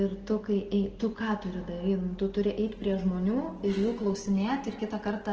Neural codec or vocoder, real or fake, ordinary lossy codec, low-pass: none; real; Opus, 16 kbps; 7.2 kHz